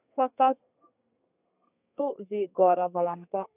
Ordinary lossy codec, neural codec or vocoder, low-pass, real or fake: AAC, 24 kbps; codec, 32 kHz, 1.9 kbps, SNAC; 3.6 kHz; fake